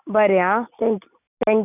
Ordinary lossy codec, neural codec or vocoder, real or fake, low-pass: none; none; real; 3.6 kHz